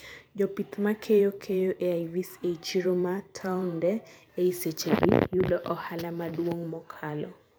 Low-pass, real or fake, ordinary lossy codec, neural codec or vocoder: none; fake; none; vocoder, 44.1 kHz, 128 mel bands every 512 samples, BigVGAN v2